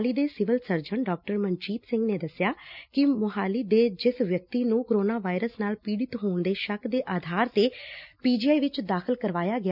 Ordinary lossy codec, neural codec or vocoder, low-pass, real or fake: none; none; 5.4 kHz; real